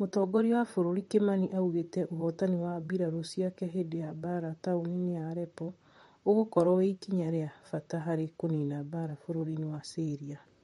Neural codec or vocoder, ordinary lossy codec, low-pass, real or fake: vocoder, 44.1 kHz, 128 mel bands, Pupu-Vocoder; MP3, 48 kbps; 19.8 kHz; fake